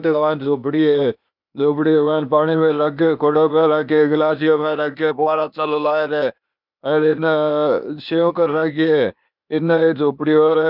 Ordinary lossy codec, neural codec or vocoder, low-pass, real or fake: none; codec, 16 kHz, 0.8 kbps, ZipCodec; 5.4 kHz; fake